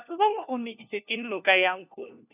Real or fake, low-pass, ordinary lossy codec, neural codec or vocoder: fake; 3.6 kHz; none; codec, 16 kHz, 1 kbps, FunCodec, trained on LibriTTS, 50 frames a second